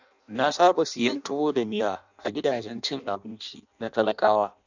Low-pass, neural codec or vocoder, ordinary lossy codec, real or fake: 7.2 kHz; codec, 16 kHz in and 24 kHz out, 0.6 kbps, FireRedTTS-2 codec; none; fake